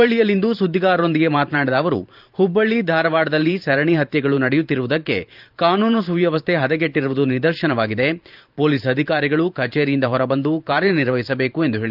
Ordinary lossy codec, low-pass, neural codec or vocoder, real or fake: Opus, 24 kbps; 5.4 kHz; vocoder, 44.1 kHz, 128 mel bands every 512 samples, BigVGAN v2; fake